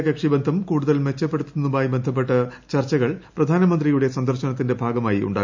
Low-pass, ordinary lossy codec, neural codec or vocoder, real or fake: 7.2 kHz; MP3, 64 kbps; none; real